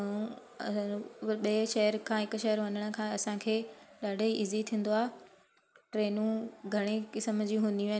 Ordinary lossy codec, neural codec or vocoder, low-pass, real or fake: none; none; none; real